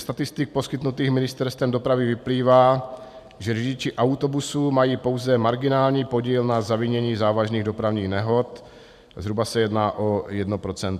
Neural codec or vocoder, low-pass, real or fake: vocoder, 44.1 kHz, 128 mel bands every 512 samples, BigVGAN v2; 14.4 kHz; fake